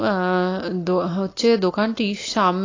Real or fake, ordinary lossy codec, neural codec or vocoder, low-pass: real; AAC, 32 kbps; none; 7.2 kHz